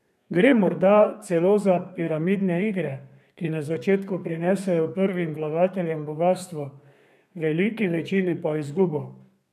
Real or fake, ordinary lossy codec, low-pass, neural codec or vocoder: fake; none; 14.4 kHz; codec, 32 kHz, 1.9 kbps, SNAC